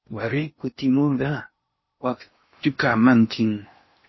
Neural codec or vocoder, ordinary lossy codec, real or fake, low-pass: codec, 16 kHz in and 24 kHz out, 0.6 kbps, FocalCodec, streaming, 4096 codes; MP3, 24 kbps; fake; 7.2 kHz